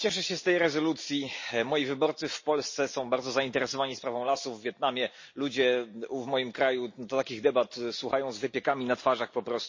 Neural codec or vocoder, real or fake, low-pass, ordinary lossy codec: none; real; 7.2 kHz; MP3, 32 kbps